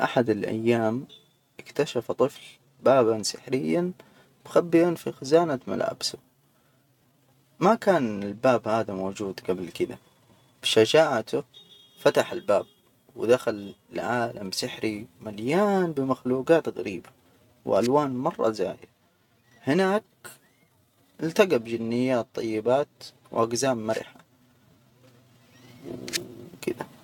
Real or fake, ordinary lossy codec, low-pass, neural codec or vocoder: real; none; 19.8 kHz; none